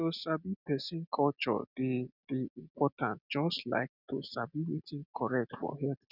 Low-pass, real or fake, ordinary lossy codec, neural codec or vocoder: 5.4 kHz; real; none; none